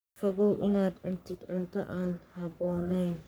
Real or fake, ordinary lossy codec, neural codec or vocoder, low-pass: fake; none; codec, 44.1 kHz, 3.4 kbps, Pupu-Codec; none